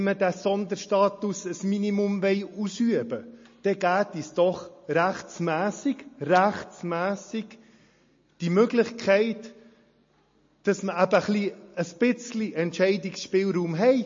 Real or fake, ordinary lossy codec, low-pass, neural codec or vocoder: real; MP3, 32 kbps; 7.2 kHz; none